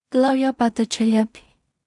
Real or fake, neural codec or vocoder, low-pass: fake; codec, 16 kHz in and 24 kHz out, 0.4 kbps, LongCat-Audio-Codec, two codebook decoder; 10.8 kHz